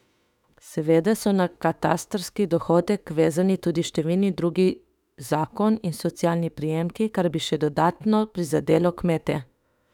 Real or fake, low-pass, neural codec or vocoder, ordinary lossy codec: fake; 19.8 kHz; autoencoder, 48 kHz, 32 numbers a frame, DAC-VAE, trained on Japanese speech; none